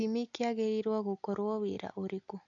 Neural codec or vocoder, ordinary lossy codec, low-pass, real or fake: none; AAC, 64 kbps; 7.2 kHz; real